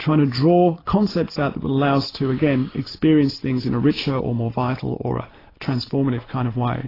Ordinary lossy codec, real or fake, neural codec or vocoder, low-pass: AAC, 24 kbps; real; none; 5.4 kHz